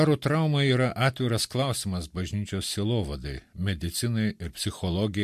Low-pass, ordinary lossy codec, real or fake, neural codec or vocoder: 14.4 kHz; MP3, 64 kbps; real; none